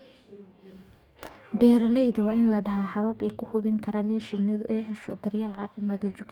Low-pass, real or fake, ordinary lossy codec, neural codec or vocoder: 19.8 kHz; fake; none; codec, 44.1 kHz, 2.6 kbps, DAC